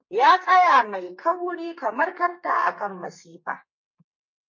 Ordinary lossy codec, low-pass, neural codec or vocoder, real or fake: MP3, 32 kbps; 7.2 kHz; codec, 32 kHz, 1.9 kbps, SNAC; fake